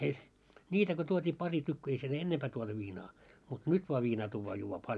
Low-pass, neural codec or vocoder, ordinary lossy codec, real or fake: none; none; none; real